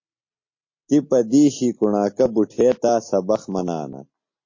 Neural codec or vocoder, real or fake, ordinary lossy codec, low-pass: none; real; MP3, 32 kbps; 7.2 kHz